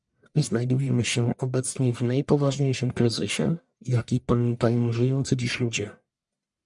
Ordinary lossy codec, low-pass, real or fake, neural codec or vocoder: MP3, 96 kbps; 10.8 kHz; fake; codec, 44.1 kHz, 1.7 kbps, Pupu-Codec